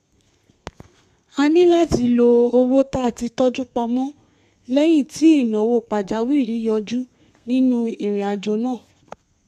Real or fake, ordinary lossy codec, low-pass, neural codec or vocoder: fake; none; 14.4 kHz; codec, 32 kHz, 1.9 kbps, SNAC